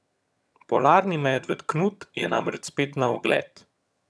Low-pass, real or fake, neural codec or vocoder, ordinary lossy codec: none; fake; vocoder, 22.05 kHz, 80 mel bands, HiFi-GAN; none